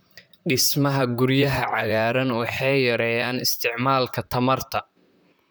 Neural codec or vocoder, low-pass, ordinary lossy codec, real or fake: vocoder, 44.1 kHz, 128 mel bands, Pupu-Vocoder; none; none; fake